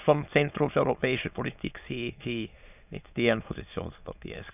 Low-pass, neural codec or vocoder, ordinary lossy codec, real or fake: 3.6 kHz; autoencoder, 22.05 kHz, a latent of 192 numbers a frame, VITS, trained on many speakers; none; fake